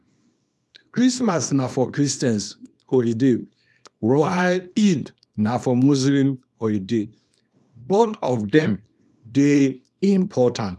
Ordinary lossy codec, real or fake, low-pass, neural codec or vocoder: none; fake; none; codec, 24 kHz, 0.9 kbps, WavTokenizer, small release